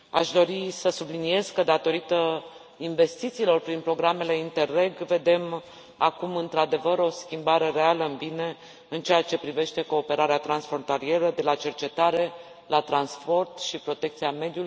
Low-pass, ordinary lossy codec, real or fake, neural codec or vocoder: none; none; real; none